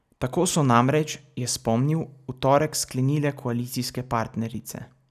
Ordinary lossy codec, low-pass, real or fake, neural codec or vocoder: none; 14.4 kHz; real; none